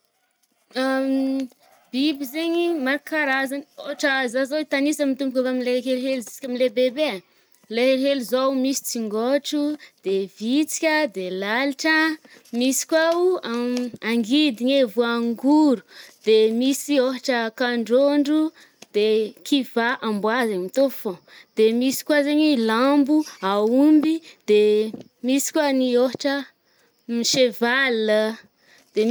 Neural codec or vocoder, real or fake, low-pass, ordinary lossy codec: none; real; none; none